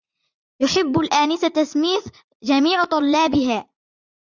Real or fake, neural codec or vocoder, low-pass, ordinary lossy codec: fake; vocoder, 44.1 kHz, 80 mel bands, Vocos; 7.2 kHz; Opus, 64 kbps